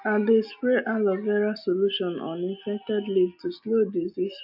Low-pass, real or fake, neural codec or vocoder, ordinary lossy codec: 5.4 kHz; real; none; AAC, 48 kbps